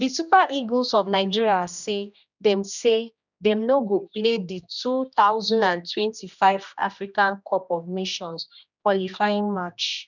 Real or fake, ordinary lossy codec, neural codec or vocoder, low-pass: fake; none; codec, 16 kHz, 1 kbps, X-Codec, HuBERT features, trained on general audio; 7.2 kHz